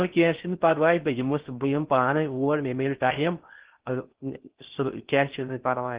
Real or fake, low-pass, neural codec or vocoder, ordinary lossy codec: fake; 3.6 kHz; codec, 16 kHz in and 24 kHz out, 0.6 kbps, FocalCodec, streaming, 4096 codes; Opus, 16 kbps